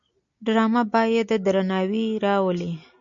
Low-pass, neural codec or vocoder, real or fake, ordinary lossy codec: 7.2 kHz; none; real; MP3, 64 kbps